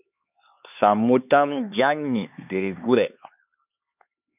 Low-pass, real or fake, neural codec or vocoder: 3.6 kHz; fake; codec, 16 kHz, 2 kbps, X-Codec, HuBERT features, trained on LibriSpeech